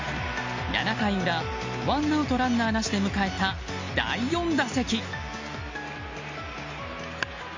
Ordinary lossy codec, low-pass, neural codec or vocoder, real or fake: MP3, 48 kbps; 7.2 kHz; none; real